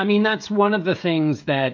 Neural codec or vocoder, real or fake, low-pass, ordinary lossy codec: codec, 16 kHz, 16 kbps, FreqCodec, smaller model; fake; 7.2 kHz; MP3, 48 kbps